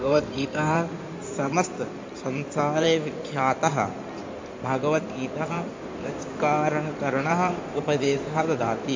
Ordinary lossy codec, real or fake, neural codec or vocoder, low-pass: MP3, 48 kbps; fake; codec, 16 kHz in and 24 kHz out, 2.2 kbps, FireRedTTS-2 codec; 7.2 kHz